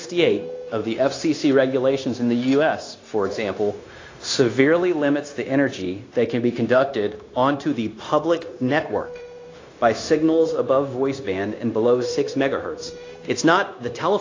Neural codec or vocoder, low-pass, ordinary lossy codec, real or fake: codec, 16 kHz, 0.9 kbps, LongCat-Audio-Codec; 7.2 kHz; AAC, 32 kbps; fake